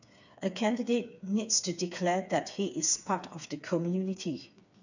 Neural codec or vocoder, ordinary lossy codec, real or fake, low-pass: codec, 16 kHz, 4 kbps, FreqCodec, smaller model; none; fake; 7.2 kHz